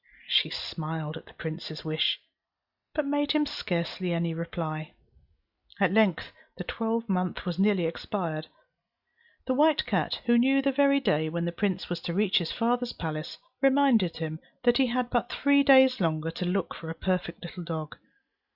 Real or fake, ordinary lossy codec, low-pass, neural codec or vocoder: real; Opus, 64 kbps; 5.4 kHz; none